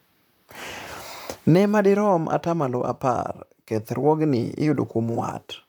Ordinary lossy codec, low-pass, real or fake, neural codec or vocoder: none; none; fake; vocoder, 44.1 kHz, 128 mel bands, Pupu-Vocoder